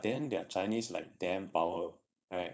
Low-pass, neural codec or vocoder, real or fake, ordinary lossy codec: none; codec, 16 kHz, 4.8 kbps, FACodec; fake; none